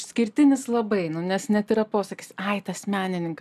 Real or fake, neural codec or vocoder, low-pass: real; none; 14.4 kHz